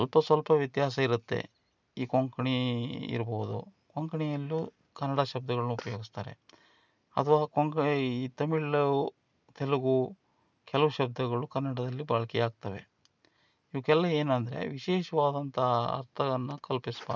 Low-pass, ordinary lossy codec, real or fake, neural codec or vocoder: 7.2 kHz; none; real; none